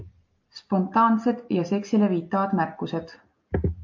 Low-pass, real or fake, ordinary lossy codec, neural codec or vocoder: 7.2 kHz; real; MP3, 48 kbps; none